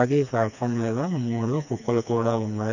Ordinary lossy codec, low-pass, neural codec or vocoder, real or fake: none; 7.2 kHz; codec, 16 kHz, 2 kbps, FreqCodec, smaller model; fake